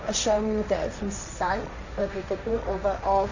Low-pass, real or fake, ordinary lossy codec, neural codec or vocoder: 7.2 kHz; fake; none; codec, 16 kHz, 1.1 kbps, Voila-Tokenizer